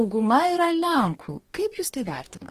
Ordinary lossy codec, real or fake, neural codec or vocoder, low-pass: Opus, 24 kbps; fake; codec, 44.1 kHz, 2.6 kbps, DAC; 14.4 kHz